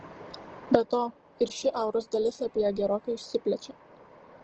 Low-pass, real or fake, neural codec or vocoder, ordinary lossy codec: 7.2 kHz; real; none; Opus, 16 kbps